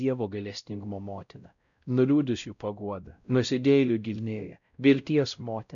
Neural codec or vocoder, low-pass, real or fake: codec, 16 kHz, 0.5 kbps, X-Codec, WavLM features, trained on Multilingual LibriSpeech; 7.2 kHz; fake